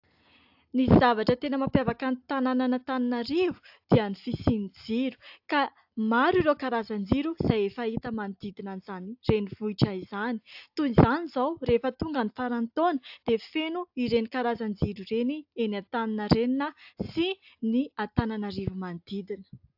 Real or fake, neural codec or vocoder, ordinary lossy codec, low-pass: real; none; AAC, 48 kbps; 5.4 kHz